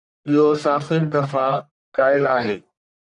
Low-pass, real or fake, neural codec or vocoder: 10.8 kHz; fake; codec, 44.1 kHz, 1.7 kbps, Pupu-Codec